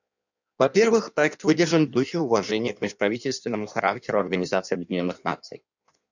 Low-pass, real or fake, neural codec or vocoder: 7.2 kHz; fake; codec, 16 kHz in and 24 kHz out, 1.1 kbps, FireRedTTS-2 codec